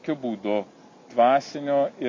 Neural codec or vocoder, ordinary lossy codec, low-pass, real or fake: none; MP3, 48 kbps; 7.2 kHz; real